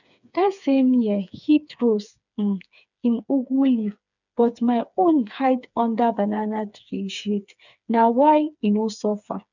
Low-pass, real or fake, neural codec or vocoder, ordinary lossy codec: 7.2 kHz; fake; codec, 16 kHz, 4 kbps, FreqCodec, smaller model; none